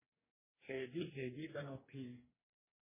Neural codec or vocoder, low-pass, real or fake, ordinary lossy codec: codec, 44.1 kHz, 2.6 kbps, DAC; 3.6 kHz; fake; MP3, 16 kbps